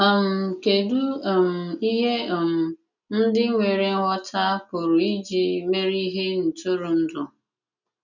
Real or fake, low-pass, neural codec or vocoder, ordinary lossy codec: real; 7.2 kHz; none; none